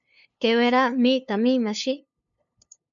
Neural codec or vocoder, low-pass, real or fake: codec, 16 kHz, 2 kbps, FunCodec, trained on LibriTTS, 25 frames a second; 7.2 kHz; fake